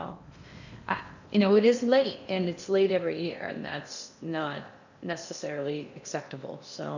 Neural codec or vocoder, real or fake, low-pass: codec, 16 kHz in and 24 kHz out, 0.8 kbps, FocalCodec, streaming, 65536 codes; fake; 7.2 kHz